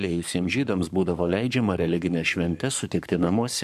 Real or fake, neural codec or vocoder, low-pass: fake; codec, 44.1 kHz, 7.8 kbps, Pupu-Codec; 14.4 kHz